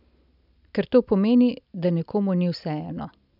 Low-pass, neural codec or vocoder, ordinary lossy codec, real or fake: 5.4 kHz; none; none; real